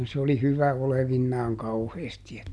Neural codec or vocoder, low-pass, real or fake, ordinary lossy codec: none; none; real; none